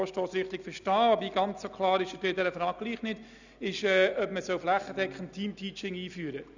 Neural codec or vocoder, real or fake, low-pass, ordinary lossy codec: none; real; 7.2 kHz; none